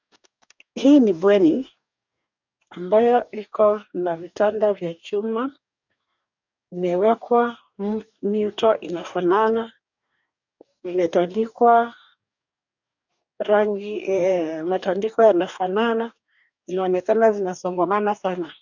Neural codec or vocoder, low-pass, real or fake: codec, 44.1 kHz, 2.6 kbps, DAC; 7.2 kHz; fake